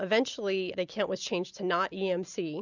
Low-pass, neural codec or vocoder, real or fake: 7.2 kHz; none; real